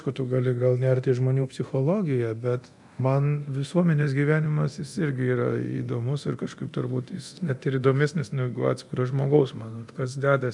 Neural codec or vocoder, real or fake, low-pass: codec, 24 kHz, 0.9 kbps, DualCodec; fake; 10.8 kHz